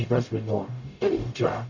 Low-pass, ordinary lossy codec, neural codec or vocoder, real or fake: 7.2 kHz; none; codec, 44.1 kHz, 0.9 kbps, DAC; fake